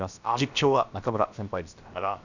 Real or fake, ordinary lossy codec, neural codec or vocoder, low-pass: fake; none; codec, 16 kHz, about 1 kbps, DyCAST, with the encoder's durations; 7.2 kHz